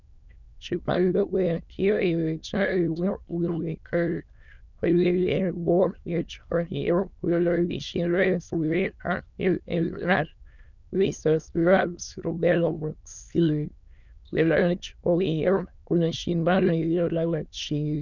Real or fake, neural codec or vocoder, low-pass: fake; autoencoder, 22.05 kHz, a latent of 192 numbers a frame, VITS, trained on many speakers; 7.2 kHz